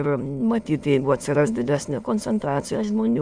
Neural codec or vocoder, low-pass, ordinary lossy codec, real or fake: autoencoder, 22.05 kHz, a latent of 192 numbers a frame, VITS, trained on many speakers; 9.9 kHz; Opus, 64 kbps; fake